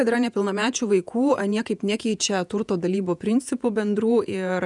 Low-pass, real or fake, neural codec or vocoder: 10.8 kHz; fake; vocoder, 48 kHz, 128 mel bands, Vocos